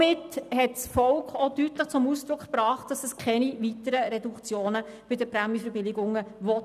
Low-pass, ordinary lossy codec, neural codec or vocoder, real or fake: 14.4 kHz; none; none; real